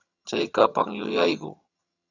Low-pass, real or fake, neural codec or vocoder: 7.2 kHz; fake; vocoder, 22.05 kHz, 80 mel bands, HiFi-GAN